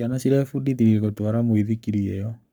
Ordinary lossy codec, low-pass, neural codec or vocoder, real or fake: none; none; codec, 44.1 kHz, 7.8 kbps, Pupu-Codec; fake